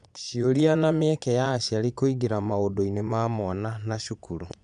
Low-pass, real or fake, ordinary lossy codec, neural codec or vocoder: 9.9 kHz; fake; none; vocoder, 22.05 kHz, 80 mel bands, Vocos